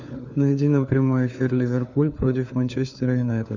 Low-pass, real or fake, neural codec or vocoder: 7.2 kHz; fake; codec, 16 kHz, 4 kbps, FunCodec, trained on LibriTTS, 50 frames a second